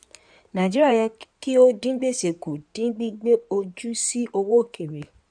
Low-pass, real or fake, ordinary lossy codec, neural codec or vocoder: 9.9 kHz; fake; none; codec, 16 kHz in and 24 kHz out, 2.2 kbps, FireRedTTS-2 codec